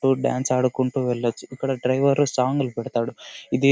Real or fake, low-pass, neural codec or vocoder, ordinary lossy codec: real; none; none; none